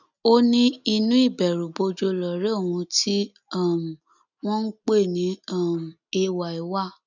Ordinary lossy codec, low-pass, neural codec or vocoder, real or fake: none; 7.2 kHz; none; real